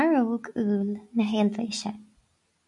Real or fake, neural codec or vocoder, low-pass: real; none; 10.8 kHz